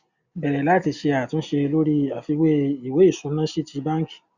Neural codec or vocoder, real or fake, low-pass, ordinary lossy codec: none; real; 7.2 kHz; Opus, 64 kbps